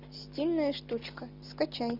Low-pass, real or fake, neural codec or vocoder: 5.4 kHz; real; none